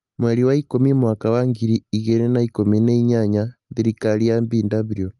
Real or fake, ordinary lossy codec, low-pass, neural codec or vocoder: real; Opus, 32 kbps; 10.8 kHz; none